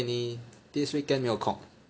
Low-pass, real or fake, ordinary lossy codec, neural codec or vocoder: none; real; none; none